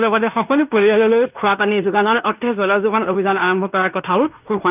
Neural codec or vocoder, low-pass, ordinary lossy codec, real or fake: codec, 16 kHz in and 24 kHz out, 0.9 kbps, LongCat-Audio-Codec, fine tuned four codebook decoder; 3.6 kHz; none; fake